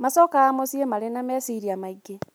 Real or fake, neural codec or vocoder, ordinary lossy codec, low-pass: real; none; none; none